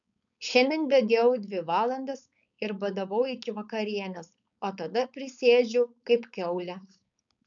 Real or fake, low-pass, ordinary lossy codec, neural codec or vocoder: fake; 7.2 kHz; MP3, 96 kbps; codec, 16 kHz, 4.8 kbps, FACodec